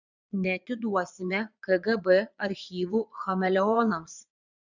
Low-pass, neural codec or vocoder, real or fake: 7.2 kHz; vocoder, 22.05 kHz, 80 mel bands, Vocos; fake